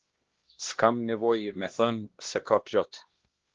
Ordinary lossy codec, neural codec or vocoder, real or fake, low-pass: Opus, 16 kbps; codec, 16 kHz, 1 kbps, X-Codec, HuBERT features, trained on LibriSpeech; fake; 7.2 kHz